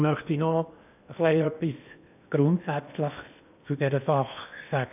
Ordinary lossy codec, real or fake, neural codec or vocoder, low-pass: none; fake; codec, 16 kHz in and 24 kHz out, 0.8 kbps, FocalCodec, streaming, 65536 codes; 3.6 kHz